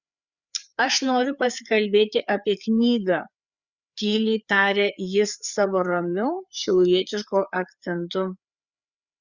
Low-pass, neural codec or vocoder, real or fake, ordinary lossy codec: 7.2 kHz; codec, 16 kHz, 4 kbps, FreqCodec, larger model; fake; Opus, 64 kbps